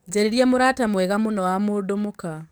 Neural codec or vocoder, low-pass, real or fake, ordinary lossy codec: codec, 44.1 kHz, 7.8 kbps, DAC; none; fake; none